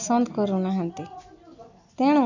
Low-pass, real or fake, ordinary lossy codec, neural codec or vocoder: 7.2 kHz; real; none; none